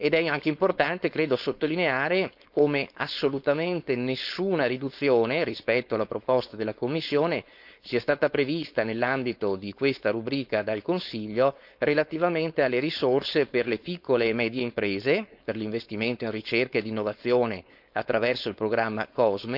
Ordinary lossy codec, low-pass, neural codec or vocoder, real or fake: none; 5.4 kHz; codec, 16 kHz, 4.8 kbps, FACodec; fake